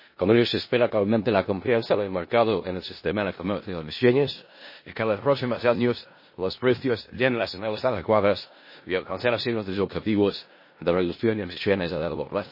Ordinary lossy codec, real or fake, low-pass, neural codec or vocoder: MP3, 24 kbps; fake; 5.4 kHz; codec, 16 kHz in and 24 kHz out, 0.4 kbps, LongCat-Audio-Codec, four codebook decoder